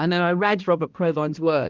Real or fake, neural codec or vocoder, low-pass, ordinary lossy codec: fake; codec, 16 kHz, 1 kbps, X-Codec, HuBERT features, trained on balanced general audio; 7.2 kHz; Opus, 24 kbps